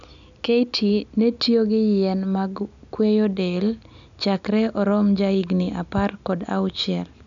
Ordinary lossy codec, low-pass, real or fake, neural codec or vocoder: none; 7.2 kHz; real; none